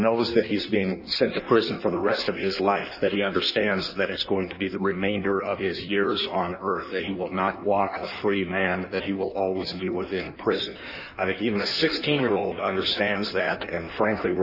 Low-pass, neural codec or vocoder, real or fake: 5.4 kHz; codec, 16 kHz in and 24 kHz out, 1.1 kbps, FireRedTTS-2 codec; fake